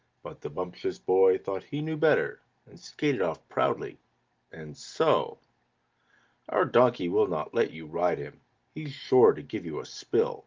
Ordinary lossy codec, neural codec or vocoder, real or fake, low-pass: Opus, 32 kbps; none; real; 7.2 kHz